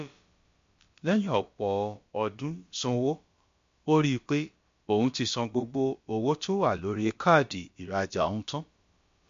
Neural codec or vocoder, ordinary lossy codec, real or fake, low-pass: codec, 16 kHz, about 1 kbps, DyCAST, with the encoder's durations; MP3, 48 kbps; fake; 7.2 kHz